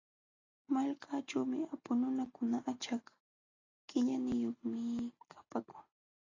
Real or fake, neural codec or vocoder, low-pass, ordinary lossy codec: real; none; 7.2 kHz; AAC, 32 kbps